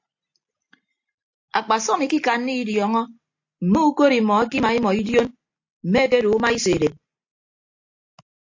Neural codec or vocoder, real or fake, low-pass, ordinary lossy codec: none; real; 7.2 kHz; AAC, 48 kbps